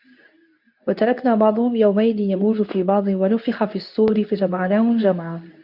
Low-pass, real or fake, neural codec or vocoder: 5.4 kHz; fake; codec, 24 kHz, 0.9 kbps, WavTokenizer, medium speech release version 2